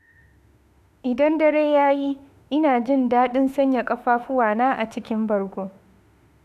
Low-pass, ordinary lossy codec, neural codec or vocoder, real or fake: 14.4 kHz; none; autoencoder, 48 kHz, 32 numbers a frame, DAC-VAE, trained on Japanese speech; fake